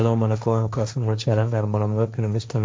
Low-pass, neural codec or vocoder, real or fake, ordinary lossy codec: none; codec, 16 kHz, 1.1 kbps, Voila-Tokenizer; fake; none